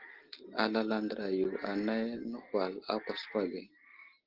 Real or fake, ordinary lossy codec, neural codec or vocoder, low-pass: real; Opus, 16 kbps; none; 5.4 kHz